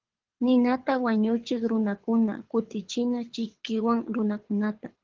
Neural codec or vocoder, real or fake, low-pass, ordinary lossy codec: codec, 24 kHz, 6 kbps, HILCodec; fake; 7.2 kHz; Opus, 16 kbps